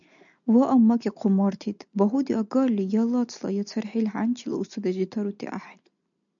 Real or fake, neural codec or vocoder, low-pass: real; none; 7.2 kHz